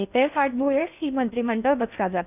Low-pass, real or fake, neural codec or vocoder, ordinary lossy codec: 3.6 kHz; fake; codec, 16 kHz in and 24 kHz out, 0.6 kbps, FocalCodec, streaming, 4096 codes; none